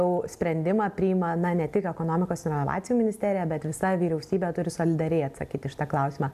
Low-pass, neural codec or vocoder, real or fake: 14.4 kHz; none; real